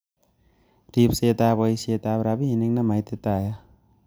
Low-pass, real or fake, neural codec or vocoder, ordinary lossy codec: none; real; none; none